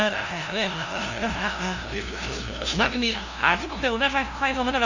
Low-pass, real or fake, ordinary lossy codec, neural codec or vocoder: 7.2 kHz; fake; none; codec, 16 kHz, 0.5 kbps, FunCodec, trained on LibriTTS, 25 frames a second